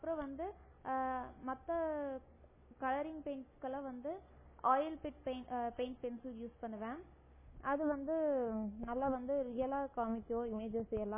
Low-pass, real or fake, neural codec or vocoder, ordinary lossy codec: 3.6 kHz; real; none; MP3, 16 kbps